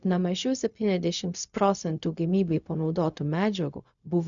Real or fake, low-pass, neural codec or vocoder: fake; 7.2 kHz; codec, 16 kHz, 0.4 kbps, LongCat-Audio-Codec